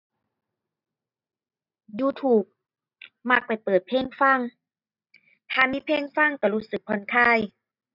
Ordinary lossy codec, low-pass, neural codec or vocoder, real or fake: none; 5.4 kHz; none; real